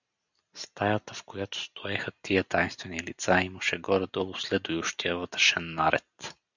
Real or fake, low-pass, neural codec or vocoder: real; 7.2 kHz; none